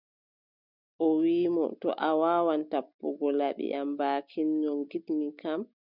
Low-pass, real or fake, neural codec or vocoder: 5.4 kHz; real; none